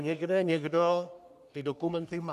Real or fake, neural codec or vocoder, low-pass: fake; codec, 44.1 kHz, 3.4 kbps, Pupu-Codec; 14.4 kHz